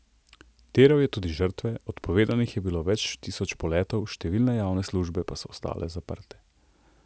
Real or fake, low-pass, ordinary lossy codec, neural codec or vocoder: real; none; none; none